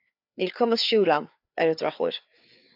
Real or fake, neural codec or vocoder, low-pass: fake; codec, 16 kHz, 4 kbps, FreqCodec, larger model; 5.4 kHz